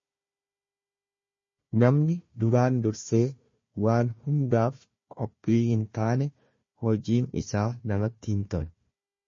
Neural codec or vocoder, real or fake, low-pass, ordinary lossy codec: codec, 16 kHz, 1 kbps, FunCodec, trained on Chinese and English, 50 frames a second; fake; 7.2 kHz; MP3, 32 kbps